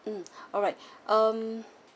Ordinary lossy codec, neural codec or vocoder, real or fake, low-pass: none; none; real; none